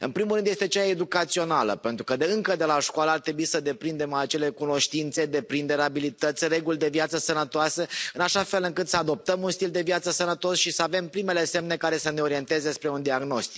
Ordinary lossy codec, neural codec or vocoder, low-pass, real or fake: none; none; none; real